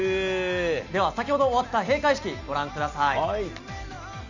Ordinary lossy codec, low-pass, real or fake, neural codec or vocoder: none; 7.2 kHz; real; none